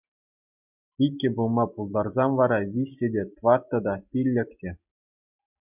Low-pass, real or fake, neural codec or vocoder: 3.6 kHz; real; none